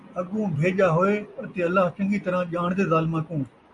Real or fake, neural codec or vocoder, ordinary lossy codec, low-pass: real; none; AAC, 64 kbps; 10.8 kHz